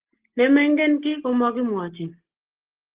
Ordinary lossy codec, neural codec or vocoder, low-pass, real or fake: Opus, 16 kbps; none; 3.6 kHz; real